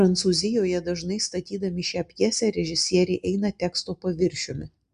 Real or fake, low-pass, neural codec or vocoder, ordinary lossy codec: real; 9.9 kHz; none; Opus, 64 kbps